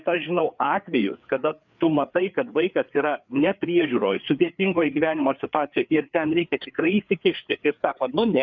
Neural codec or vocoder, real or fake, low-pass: codec, 16 kHz, 4 kbps, FreqCodec, larger model; fake; 7.2 kHz